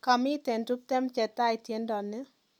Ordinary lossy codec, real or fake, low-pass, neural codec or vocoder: none; real; 19.8 kHz; none